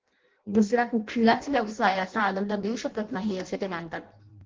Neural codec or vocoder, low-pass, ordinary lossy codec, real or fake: codec, 16 kHz in and 24 kHz out, 0.6 kbps, FireRedTTS-2 codec; 7.2 kHz; Opus, 16 kbps; fake